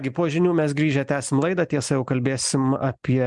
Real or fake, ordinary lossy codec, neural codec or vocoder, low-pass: real; MP3, 96 kbps; none; 10.8 kHz